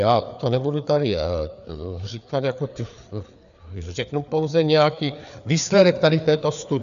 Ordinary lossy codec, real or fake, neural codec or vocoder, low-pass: AAC, 96 kbps; fake; codec, 16 kHz, 4 kbps, FreqCodec, larger model; 7.2 kHz